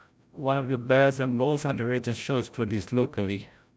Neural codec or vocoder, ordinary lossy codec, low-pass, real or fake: codec, 16 kHz, 0.5 kbps, FreqCodec, larger model; none; none; fake